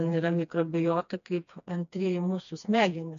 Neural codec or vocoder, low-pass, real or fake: codec, 16 kHz, 2 kbps, FreqCodec, smaller model; 7.2 kHz; fake